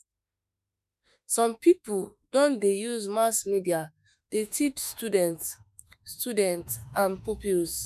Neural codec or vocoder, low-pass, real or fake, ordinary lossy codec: autoencoder, 48 kHz, 32 numbers a frame, DAC-VAE, trained on Japanese speech; 14.4 kHz; fake; none